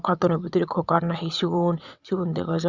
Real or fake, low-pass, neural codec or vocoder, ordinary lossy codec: fake; 7.2 kHz; vocoder, 22.05 kHz, 80 mel bands, WaveNeXt; Opus, 64 kbps